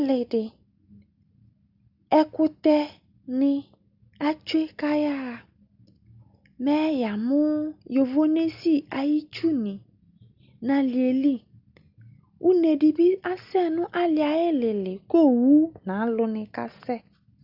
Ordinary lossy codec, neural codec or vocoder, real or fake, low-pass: Opus, 64 kbps; none; real; 5.4 kHz